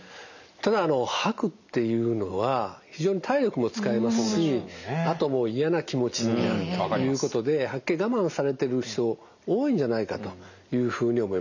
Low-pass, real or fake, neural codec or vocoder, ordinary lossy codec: 7.2 kHz; real; none; none